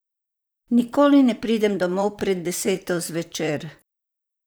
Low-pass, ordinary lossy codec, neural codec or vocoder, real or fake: none; none; vocoder, 44.1 kHz, 128 mel bands, Pupu-Vocoder; fake